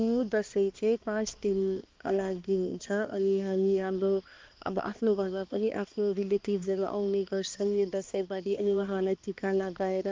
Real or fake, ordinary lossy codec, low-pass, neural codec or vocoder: fake; Opus, 24 kbps; 7.2 kHz; codec, 16 kHz, 2 kbps, X-Codec, HuBERT features, trained on balanced general audio